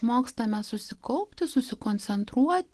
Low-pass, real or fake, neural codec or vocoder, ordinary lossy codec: 10.8 kHz; real; none; Opus, 16 kbps